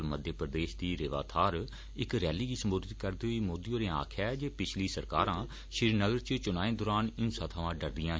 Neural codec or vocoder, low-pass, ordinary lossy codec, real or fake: none; none; none; real